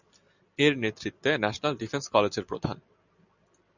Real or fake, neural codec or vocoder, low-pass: real; none; 7.2 kHz